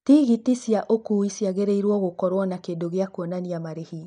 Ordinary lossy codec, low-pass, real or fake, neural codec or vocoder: none; 9.9 kHz; real; none